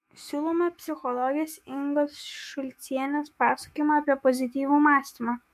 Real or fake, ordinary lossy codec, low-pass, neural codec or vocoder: fake; MP3, 64 kbps; 14.4 kHz; autoencoder, 48 kHz, 128 numbers a frame, DAC-VAE, trained on Japanese speech